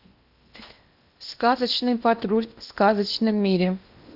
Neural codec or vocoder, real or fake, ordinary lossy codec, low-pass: codec, 16 kHz in and 24 kHz out, 0.8 kbps, FocalCodec, streaming, 65536 codes; fake; none; 5.4 kHz